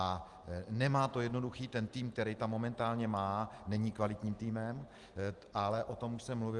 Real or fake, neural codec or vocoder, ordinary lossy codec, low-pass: real; none; Opus, 24 kbps; 10.8 kHz